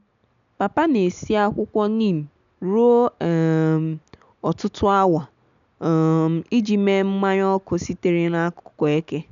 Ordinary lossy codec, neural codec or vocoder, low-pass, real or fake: none; none; 7.2 kHz; real